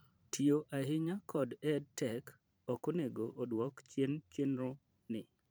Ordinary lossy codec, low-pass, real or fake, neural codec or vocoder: none; none; fake; vocoder, 44.1 kHz, 128 mel bands every 512 samples, BigVGAN v2